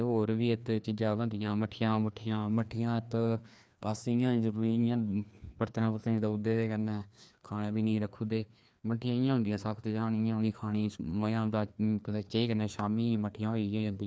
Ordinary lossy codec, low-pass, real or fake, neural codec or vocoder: none; none; fake; codec, 16 kHz, 2 kbps, FreqCodec, larger model